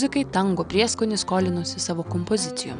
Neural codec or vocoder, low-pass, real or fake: none; 9.9 kHz; real